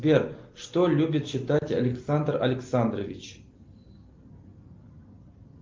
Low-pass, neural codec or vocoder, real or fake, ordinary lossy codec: 7.2 kHz; none; real; Opus, 32 kbps